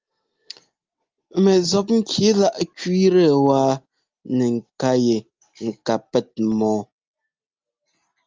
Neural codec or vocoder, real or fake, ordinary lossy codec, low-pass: none; real; Opus, 32 kbps; 7.2 kHz